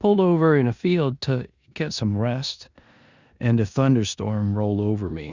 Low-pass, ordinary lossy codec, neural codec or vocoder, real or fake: 7.2 kHz; Opus, 64 kbps; codec, 16 kHz in and 24 kHz out, 0.9 kbps, LongCat-Audio-Codec, four codebook decoder; fake